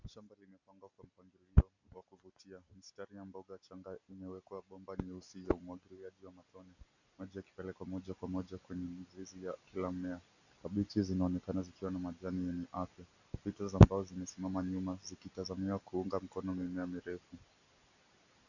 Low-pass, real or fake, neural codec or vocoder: 7.2 kHz; real; none